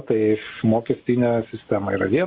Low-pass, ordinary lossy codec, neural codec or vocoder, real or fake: 5.4 kHz; Opus, 64 kbps; none; real